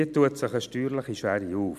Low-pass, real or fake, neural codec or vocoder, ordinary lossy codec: 14.4 kHz; real; none; none